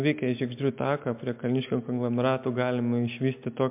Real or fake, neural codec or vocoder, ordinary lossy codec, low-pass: real; none; MP3, 32 kbps; 3.6 kHz